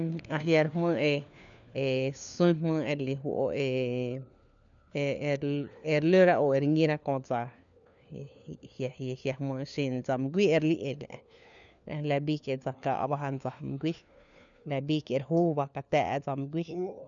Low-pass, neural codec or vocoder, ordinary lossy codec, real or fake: 7.2 kHz; codec, 16 kHz, 4 kbps, FunCodec, trained on LibriTTS, 50 frames a second; none; fake